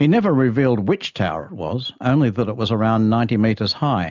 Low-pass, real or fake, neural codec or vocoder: 7.2 kHz; real; none